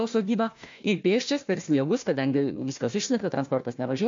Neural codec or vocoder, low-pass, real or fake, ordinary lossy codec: codec, 16 kHz, 1 kbps, FunCodec, trained on Chinese and English, 50 frames a second; 7.2 kHz; fake; MP3, 48 kbps